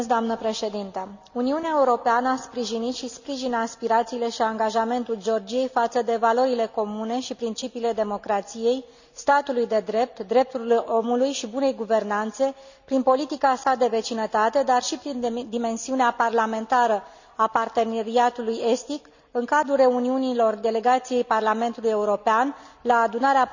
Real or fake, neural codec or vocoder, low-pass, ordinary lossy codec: real; none; 7.2 kHz; none